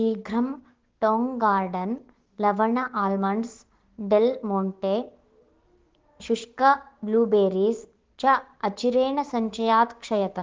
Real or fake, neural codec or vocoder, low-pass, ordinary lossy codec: fake; codec, 16 kHz, 6 kbps, DAC; 7.2 kHz; Opus, 16 kbps